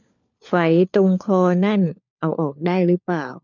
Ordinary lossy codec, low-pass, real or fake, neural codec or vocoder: none; 7.2 kHz; fake; codec, 16 kHz, 4 kbps, FunCodec, trained on LibriTTS, 50 frames a second